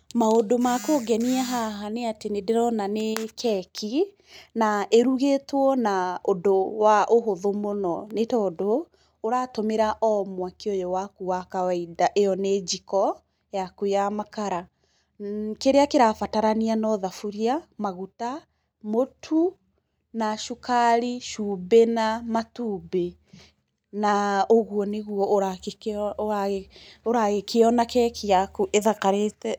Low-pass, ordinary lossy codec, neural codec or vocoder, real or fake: none; none; none; real